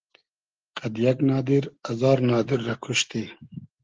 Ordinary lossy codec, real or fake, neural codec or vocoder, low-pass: Opus, 16 kbps; real; none; 7.2 kHz